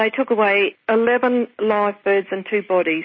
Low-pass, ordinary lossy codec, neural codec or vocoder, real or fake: 7.2 kHz; MP3, 24 kbps; none; real